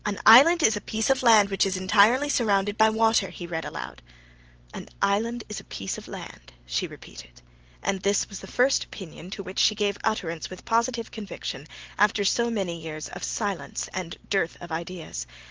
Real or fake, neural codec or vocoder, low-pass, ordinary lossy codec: real; none; 7.2 kHz; Opus, 16 kbps